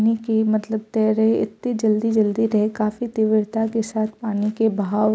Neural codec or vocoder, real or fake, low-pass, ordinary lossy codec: none; real; none; none